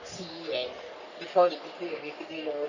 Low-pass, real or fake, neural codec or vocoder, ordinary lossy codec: 7.2 kHz; fake; codec, 44.1 kHz, 3.4 kbps, Pupu-Codec; none